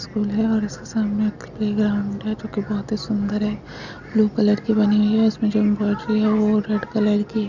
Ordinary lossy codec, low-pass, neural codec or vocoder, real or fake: none; 7.2 kHz; none; real